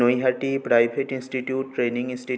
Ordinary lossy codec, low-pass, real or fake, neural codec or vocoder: none; none; real; none